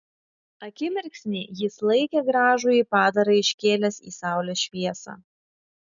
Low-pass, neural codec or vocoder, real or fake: 7.2 kHz; none; real